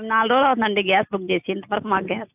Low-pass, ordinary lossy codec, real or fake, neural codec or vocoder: 3.6 kHz; AAC, 32 kbps; real; none